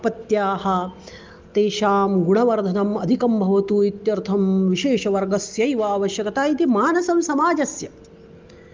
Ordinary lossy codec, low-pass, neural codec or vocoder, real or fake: Opus, 24 kbps; 7.2 kHz; none; real